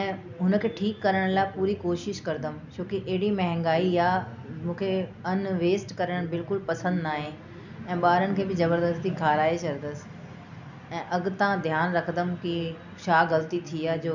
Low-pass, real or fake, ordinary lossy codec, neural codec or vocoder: 7.2 kHz; real; none; none